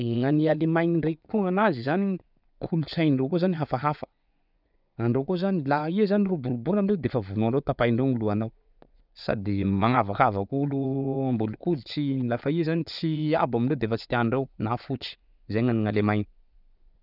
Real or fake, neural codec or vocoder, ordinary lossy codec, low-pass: fake; vocoder, 24 kHz, 100 mel bands, Vocos; none; 5.4 kHz